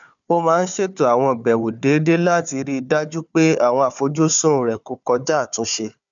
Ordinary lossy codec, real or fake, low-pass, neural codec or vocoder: none; fake; 7.2 kHz; codec, 16 kHz, 4 kbps, FunCodec, trained on Chinese and English, 50 frames a second